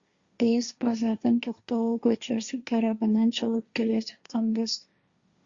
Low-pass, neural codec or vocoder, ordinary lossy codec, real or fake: 7.2 kHz; codec, 16 kHz, 1.1 kbps, Voila-Tokenizer; Opus, 64 kbps; fake